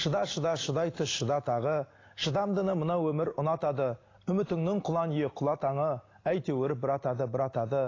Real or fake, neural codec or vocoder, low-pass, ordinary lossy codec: real; none; 7.2 kHz; AAC, 32 kbps